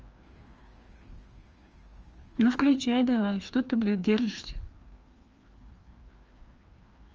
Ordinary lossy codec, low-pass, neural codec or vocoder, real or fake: Opus, 24 kbps; 7.2 kHz; codec, 16 kHz, 2 kbps, FreqCodec, larger model; fake